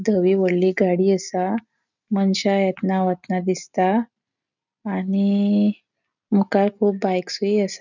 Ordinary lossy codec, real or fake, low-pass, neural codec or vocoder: none; real; 7.2 kHz; none